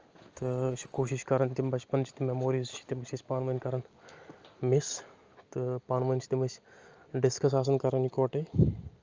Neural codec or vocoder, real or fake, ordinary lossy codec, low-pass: none; real; Opus, 24 kbps; 7.2 kHz